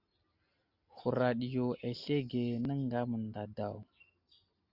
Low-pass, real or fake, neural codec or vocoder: 5.4 kHz; real; none